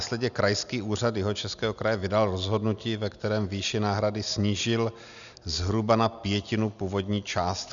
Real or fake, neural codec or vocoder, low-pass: real; none; 7.2 kHz